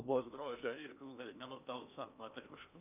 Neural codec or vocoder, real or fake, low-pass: codec, 16 kHz in and 24 kHz out, 0.8 kbps, FocalCodec, streaming, 65536 codes; fake; 3.6 kHz